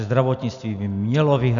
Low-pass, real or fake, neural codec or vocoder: 7.2 kHz; real; none